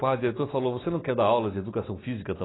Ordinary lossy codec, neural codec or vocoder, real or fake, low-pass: AAC, 16 kbps; none; real; 7.2 kHz